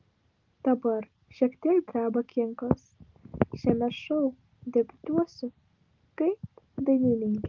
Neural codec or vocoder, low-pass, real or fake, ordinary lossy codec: none; 7.2 kHz; real; Opus, 24 kbps